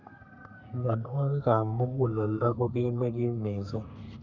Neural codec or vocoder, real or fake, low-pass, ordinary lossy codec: codec, 32 kHz, 1.9 kbps, SNAC; fake; 7.2 kHz; none